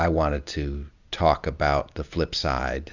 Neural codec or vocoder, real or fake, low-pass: none; real; 7.2 kHz